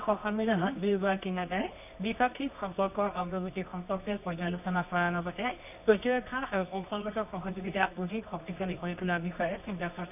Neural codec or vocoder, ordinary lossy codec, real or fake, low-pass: codec, 24 kHz, 0.9 kbps, WavTokenizer, medium music audio release; none; fake; 3.6 kHz